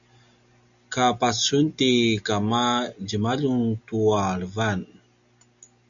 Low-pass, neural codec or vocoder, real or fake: 7.2 kHz; none; real